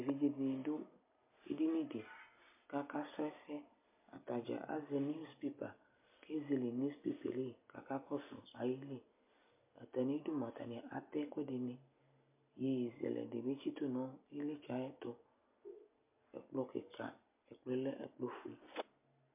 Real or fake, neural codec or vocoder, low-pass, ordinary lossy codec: real; none; 3.6 kHz; MP3, 32 kbps